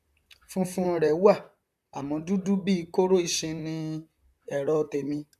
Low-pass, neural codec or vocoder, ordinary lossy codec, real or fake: 14.4 kHz; vocoder, 44.1 kHz, 128 mel bands, Pupu-Vocoder; none; fake